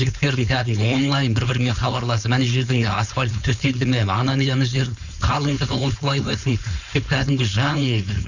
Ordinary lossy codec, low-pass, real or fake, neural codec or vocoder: none; 7.2 kHz; fake; codec, 16 kHz, 4.8 kbps, FACodec